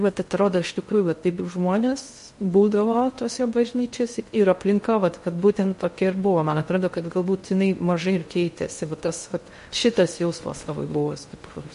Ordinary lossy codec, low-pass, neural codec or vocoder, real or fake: MP3, 48 kbps; 10.8 kHz; codec, 16 kHz in and 24 kHz out, 0.6 kbps, FocalCodec, streaming, 2048 codes; fake